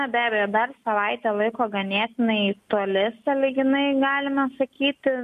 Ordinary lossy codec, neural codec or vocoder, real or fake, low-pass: MP3, 64 kbps; none; real; 14.4 kHz